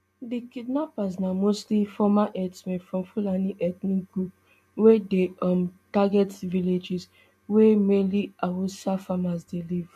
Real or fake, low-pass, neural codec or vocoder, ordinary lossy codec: real; 14.4 kHz; none; MP3, 64 kbps